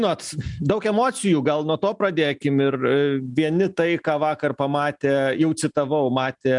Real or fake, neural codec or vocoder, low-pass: real; none; 10.8 kHz